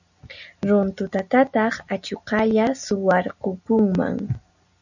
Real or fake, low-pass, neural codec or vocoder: real; 7.2 kHz; none